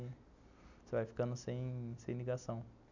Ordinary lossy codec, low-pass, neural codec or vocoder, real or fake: none; 7.2 kHz; none; real